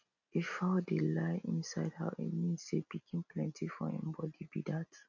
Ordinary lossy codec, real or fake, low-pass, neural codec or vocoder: none; real; 7.2 kHz; none